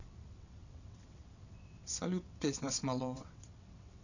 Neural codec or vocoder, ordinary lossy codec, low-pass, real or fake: vocoder, 22.05 kHz, 80 mel bands, WaveNeXt; none; 7.2 kHz; fake